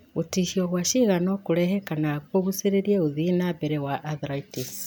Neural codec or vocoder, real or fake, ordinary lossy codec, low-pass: none; real; none; none